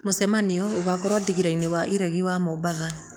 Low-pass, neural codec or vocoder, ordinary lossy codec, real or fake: none; codec, 44.1 kHz, 7.8 kbps, DAC; none; fake